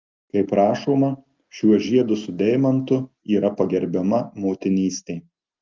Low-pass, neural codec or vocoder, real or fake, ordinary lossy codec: 7.2 kHz; none; real; Opus, 32 kbps